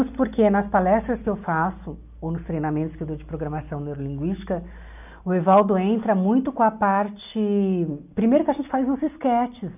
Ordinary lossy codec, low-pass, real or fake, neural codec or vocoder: none; 3.6 kHz; real; none